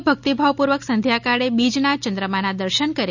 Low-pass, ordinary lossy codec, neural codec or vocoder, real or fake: 7.2 kHz; none; none; real